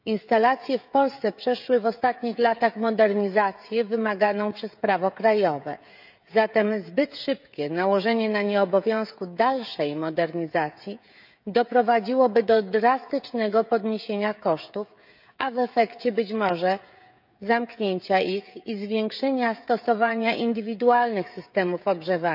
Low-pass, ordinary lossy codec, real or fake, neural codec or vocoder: 5.4 kHz; none; fake; codec, 16 kHz, 16 kbps, FreqCodec, smaller model